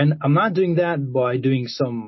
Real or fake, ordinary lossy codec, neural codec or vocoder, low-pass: real; MP3, 24 kbps; none; 7.2 kHz